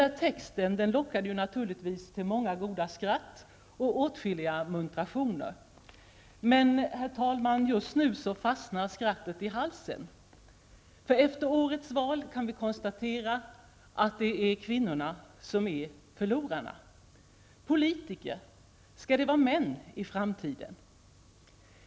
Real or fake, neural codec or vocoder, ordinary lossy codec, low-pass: real; none; none; none